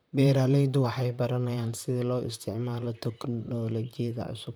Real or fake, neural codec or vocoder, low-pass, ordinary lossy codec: fake; vocoder, 44.1 kHz, 128 mel bands, Pupu-Vocoder; none; none